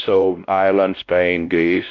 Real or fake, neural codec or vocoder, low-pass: fake; codec, 16 kHz, 1 kbps, X-Codec, WavLM features, trained on Multilingual LibriSpeech; 7.2 kHz